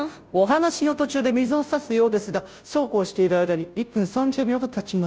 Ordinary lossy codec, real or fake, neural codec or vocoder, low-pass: none; fake; codec, 16 kHz, 0.5 kbps, FunCodec, trained on Chinese and English, 25 frames a second; none